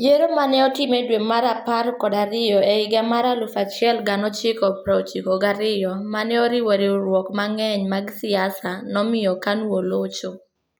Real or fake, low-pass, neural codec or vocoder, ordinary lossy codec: real; none; none; none